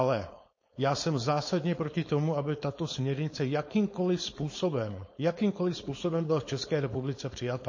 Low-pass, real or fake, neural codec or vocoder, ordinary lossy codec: 7.2 kHz; fake; codec, 16 kHz, 4.8 kbps, FACodec; MP3, 32 kbps